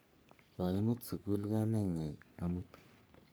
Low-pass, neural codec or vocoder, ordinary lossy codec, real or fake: none; codec, 44.1 kHz, 3.4 kbps, Pupu-Codec; none; fake